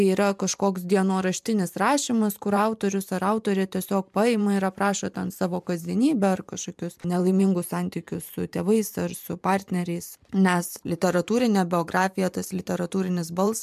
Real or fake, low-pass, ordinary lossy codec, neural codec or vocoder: fake; 14.4 kHz; MP3, 96 kbps; vocoder, 44.1 kHz, 128 mel bands every 512 samples, BigVGAN v2